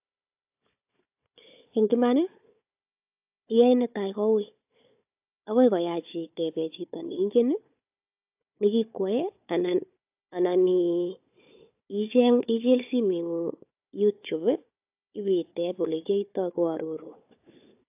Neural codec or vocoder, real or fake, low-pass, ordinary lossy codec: codec, 16 kHz, 4 kbps, FunCodec, trained on Chinese and English, 50 frames a second; fake; 3.6 kHz; AAC, 32 kbps